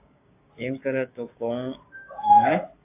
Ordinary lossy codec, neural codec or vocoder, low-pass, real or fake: AAC, 24 kbps; codec, 16 kHz, 6 kbps, DAC; 3.6 kHz; fake